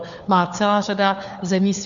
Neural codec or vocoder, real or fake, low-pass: codec, 16 kHz, 4 kbps, FunCodec, trained on LibriTTS, 50 frames a second; fake; 7.2 kHz